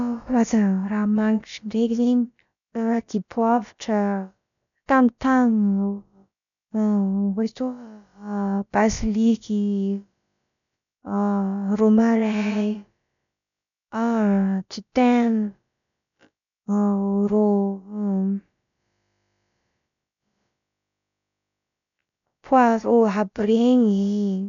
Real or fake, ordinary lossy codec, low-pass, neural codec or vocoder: fake; none; 7.2 kHz; codec, 16 kHz, about 1 kbps, DyCAST, with the encoder's durations